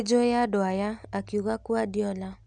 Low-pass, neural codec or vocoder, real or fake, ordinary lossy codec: 10.8 kHz; none; real; none